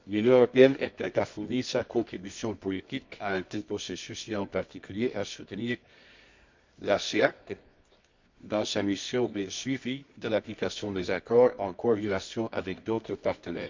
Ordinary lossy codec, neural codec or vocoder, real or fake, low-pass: MP3, 64 kbps; codec, 24 kHz, 0.9 kbps, WavTokenizer, medium music audio release; fake; 7.2 kHz